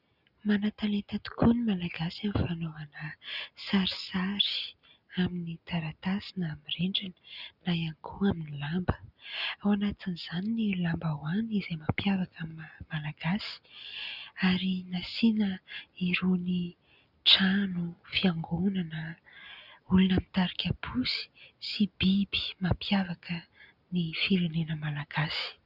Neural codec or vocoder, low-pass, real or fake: none; 5.4 kHz; real